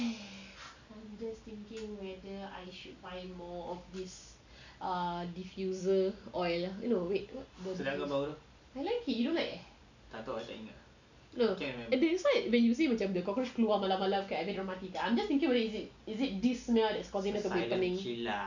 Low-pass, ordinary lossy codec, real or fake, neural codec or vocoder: 7.2 kHz; none; real; none